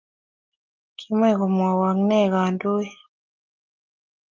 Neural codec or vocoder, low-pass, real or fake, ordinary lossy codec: none; 7.2 kHz; real; Opus, 32 kbps